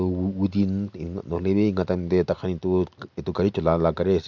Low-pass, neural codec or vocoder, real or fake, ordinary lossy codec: 7.2 kHz; none; real; none